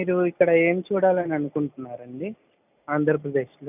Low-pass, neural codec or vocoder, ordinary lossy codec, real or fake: 3.6 kHz; none; none; real